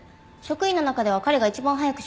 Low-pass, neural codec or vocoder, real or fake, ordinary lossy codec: none; none; real; none